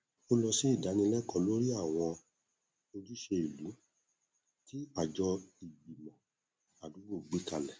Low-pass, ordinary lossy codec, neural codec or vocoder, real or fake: none; none; none; real